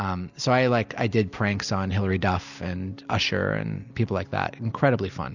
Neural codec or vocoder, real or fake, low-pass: none; real; 7.2 kHz